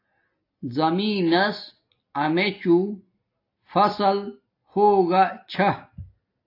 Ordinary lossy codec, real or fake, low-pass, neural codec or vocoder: AAC, 32 kbps; real; 5.4 kHz; none